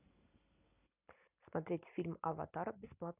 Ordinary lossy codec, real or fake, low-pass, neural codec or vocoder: none; real; 3.6 kHz; none